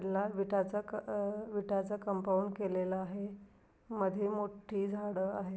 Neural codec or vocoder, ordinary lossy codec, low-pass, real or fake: none; none; none; real